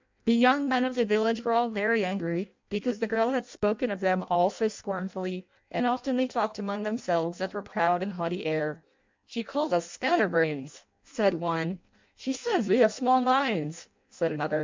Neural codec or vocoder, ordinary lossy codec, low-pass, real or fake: codec, 16 kHz in and 24 kHz out, 0.6 kbps, FireRedTTS-2 codec; MP3, 64 kbps; 7.2 kHz; fake